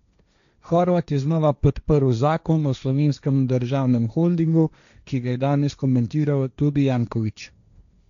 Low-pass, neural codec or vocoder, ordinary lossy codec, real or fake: 7.2 kHz; codec, 16 kHz, 1.1 kbps, Voila-Tokenizer; none; fake